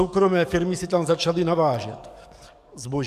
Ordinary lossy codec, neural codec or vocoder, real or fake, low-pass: MP3, 96 kbps; codec, 44.1 kHz, 7.8 kbps, DAC; fake; 14.4 kHz